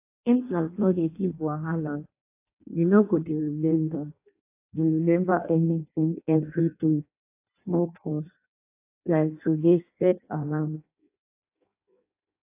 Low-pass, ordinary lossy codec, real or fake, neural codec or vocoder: 3.6 kHz; AAC, 24 kbps; fake; codec, 16 kHz in and 24 kHz out, 0.6 kbps, FireRedTTS-2 codec